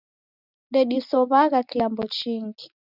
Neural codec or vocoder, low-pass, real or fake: none; 5.4 kHz; real